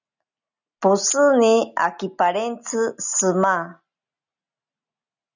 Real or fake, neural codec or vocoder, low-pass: real; none; 7.2 kHz